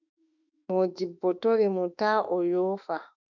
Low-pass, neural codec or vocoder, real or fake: 7.2 kHz; autoencoder, 48 kHz, 32 numbers a frame, DAC-VAE, trained on Japanese speech; fake